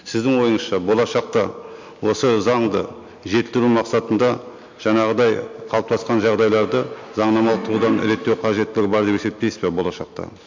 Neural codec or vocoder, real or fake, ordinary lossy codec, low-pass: none; real; MP3, 48 kbps; 7.2 kHz